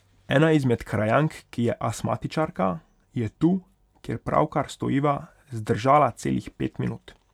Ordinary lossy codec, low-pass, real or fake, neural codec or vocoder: none; 19.8 kHz; real; none